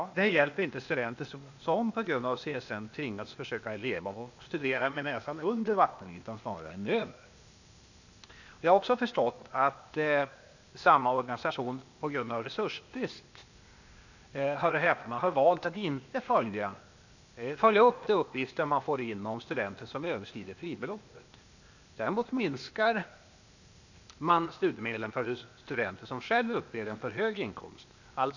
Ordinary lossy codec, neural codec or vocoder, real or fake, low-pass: none; codec, 16 kHz, 0.8 kbps, ZipCodec; fake; 7.2 kHz